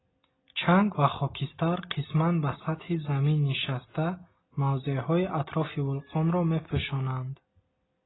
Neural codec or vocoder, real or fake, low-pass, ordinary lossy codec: none; real; 7.2 kHz; AAC, 16 kbps